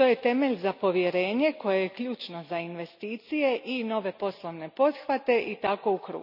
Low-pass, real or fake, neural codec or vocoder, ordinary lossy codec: 5.4 kHz; real; none; none